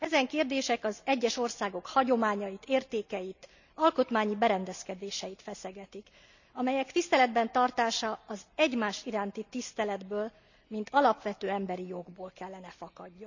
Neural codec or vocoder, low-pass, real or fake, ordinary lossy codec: none; 7.2 kHz; real; none